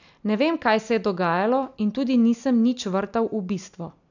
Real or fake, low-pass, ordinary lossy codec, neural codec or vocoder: real; 7.2 kHz; none; none